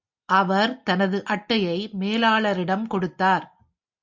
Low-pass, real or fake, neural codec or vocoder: 7.2 kHz; real; none